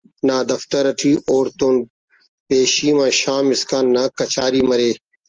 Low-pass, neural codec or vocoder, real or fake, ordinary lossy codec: 7.2 kHz; none; real; Opus, 24 kbps